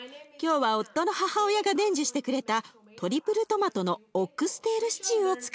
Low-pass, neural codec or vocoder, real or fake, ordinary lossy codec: none; none; real; none